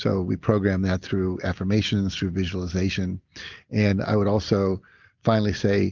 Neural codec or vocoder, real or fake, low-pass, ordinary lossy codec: none; real; 7.2 kHz; Opus, 32 kbps